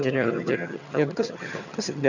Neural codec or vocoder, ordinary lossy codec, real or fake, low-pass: vocoder, 22.05 kHz, 80 mel bands, HiFi-GAN; none; fake; 7.2 kHz